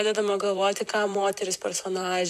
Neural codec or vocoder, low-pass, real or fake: vocoder, 44.1 kHz, 128 mel bands, Pupu-Vocoder; 14.4 kHz; fake